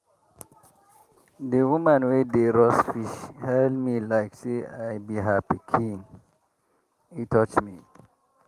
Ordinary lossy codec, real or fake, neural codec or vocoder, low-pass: Opus, 32 kbps; fake; vocoder, 44.1 kHz, 128 mel bands every 512 samples, BigVGAN v2; 14.4 kHz